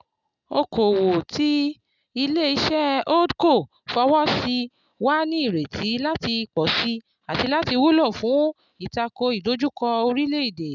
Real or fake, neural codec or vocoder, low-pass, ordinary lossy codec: real; none; 7.2 kHz; none